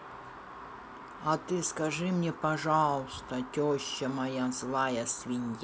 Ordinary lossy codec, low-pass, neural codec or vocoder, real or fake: none; none; none; real